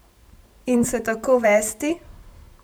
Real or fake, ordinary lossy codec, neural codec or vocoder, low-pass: fake; none; vocoder, 44.1 kHz, 128 mel bands every 512 samples, BigVGAN v2; none